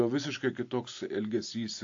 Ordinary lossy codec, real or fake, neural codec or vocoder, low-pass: AAC, 48 kbps; real; none; 7.2 kHz